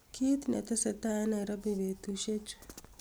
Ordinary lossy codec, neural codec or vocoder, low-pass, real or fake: none; none; none; real